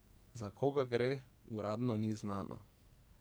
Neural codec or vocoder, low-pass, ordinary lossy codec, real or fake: codec, 44.1 kHz, 2.6 kbps, SNAC; none; none; fake